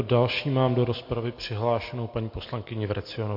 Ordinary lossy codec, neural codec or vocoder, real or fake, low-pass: MP3, 32 kbps; none; real; 5.4 kHz